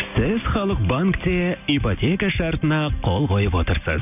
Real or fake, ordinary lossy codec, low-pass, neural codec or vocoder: real; none; 3.6 kHz; none